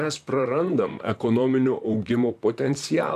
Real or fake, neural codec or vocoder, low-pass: fake; vocoder, 44.1 kHz, 128 mel bands, Pupu-Vocoder; 14.4 kHz